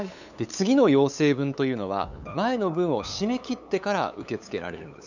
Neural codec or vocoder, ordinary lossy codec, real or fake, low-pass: codec, 16 kHz, 8 kbps, FunCodec, trained on LibriTTS, 25 frames a second; none; fake; 7.2 kHz